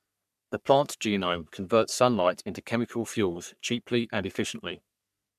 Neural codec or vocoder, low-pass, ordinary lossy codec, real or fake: codec, 44.1 kHz, 3.4 kbps, Pupu-Codec; 14.4 kHz; none; fake